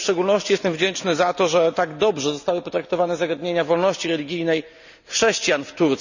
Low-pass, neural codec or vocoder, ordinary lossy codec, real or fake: 7.2 kHz; none; none; real